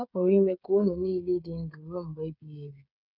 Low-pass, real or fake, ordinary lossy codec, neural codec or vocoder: 5.4 kHz; fake; none; codec, 16 kHz, 8 kbps, FreqCodec, smaller model